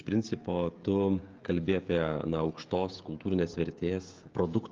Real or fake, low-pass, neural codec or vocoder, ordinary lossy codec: fake; 7.2 kHz; codec, 16 kHz, 16 kbps, FreqCodec, smaller model; Opus, 24 kbps